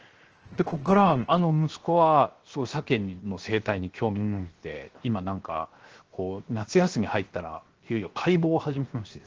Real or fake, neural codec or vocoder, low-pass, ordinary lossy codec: fake; codec, 16 kHz, 0.7 kbps, FocalCodec; 7.2 kHz; Opus, 16 kbps